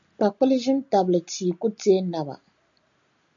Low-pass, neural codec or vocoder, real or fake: 7.2 kHz; none; real